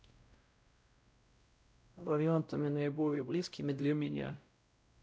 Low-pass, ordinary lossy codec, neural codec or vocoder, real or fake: none; none; codec, 16 kHz, 0.5 kbps, X-Codec, WavLM features, trained on Multilingual LibriSpeech; fake